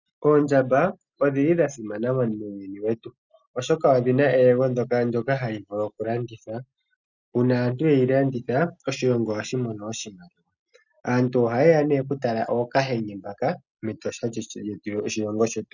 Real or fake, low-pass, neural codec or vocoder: real; 7.2 kHz; none